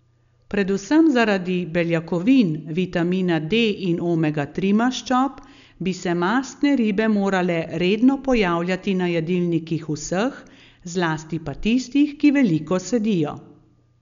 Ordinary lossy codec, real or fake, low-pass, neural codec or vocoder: none; real; 7.2 kHz; none